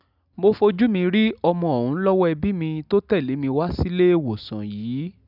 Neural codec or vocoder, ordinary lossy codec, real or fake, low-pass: none; none; real; 5.4 kHz